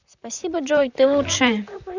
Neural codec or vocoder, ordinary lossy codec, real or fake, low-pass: vocoder, 44.1 kHz, 128 mel bands, Pupu-Vocoder; none; fake; 7.2 kHz